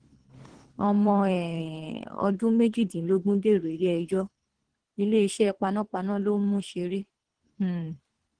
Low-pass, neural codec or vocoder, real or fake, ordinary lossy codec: 9.9 kHz; codec, 24 kHz, 3 kbps, HILCodec; fake; Opus, 16 kbps